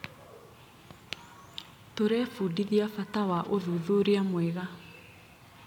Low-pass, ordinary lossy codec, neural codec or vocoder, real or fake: 19.8 kHz; MP3, 96 kbps; vocoder, 44.1 kHz, 128 mel bands every 256 samples, BigVGAN v2; fake